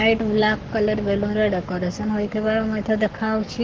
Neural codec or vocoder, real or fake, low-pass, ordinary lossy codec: codec, 44.1 kHz, 7.8 kbps, Pupu-Codec; fake; 7.2 kHz; Opus, 16 kbps